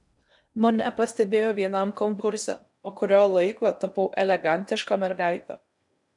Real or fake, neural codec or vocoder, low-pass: fake; codec, 16 kHz in and 24 kHz out, 0.8 kbps, FocalCodec, streaming, 65536 codes; 10.8 kHz